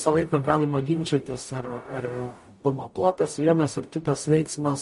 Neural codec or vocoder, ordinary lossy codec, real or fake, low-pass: codec, 44.1 kHz, 0.9 kbps, DAC; MP3, 48 kbps; fake; 14.4 kHz